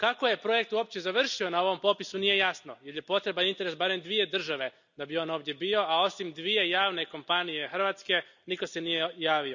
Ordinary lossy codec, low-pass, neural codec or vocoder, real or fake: none; 7.2 kHz; none; real